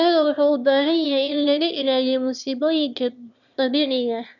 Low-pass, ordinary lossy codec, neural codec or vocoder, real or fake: 7.2 kHz; none; autoencoder, 22.05 kHz, a latent of 192 numbers a frame, VITS, trained on one speaker; fake